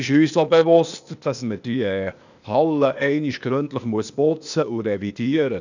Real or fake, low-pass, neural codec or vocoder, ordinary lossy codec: fake; 7.2 kHz; codec, 16 kHz, 0.8 kbps, ZipCodec; none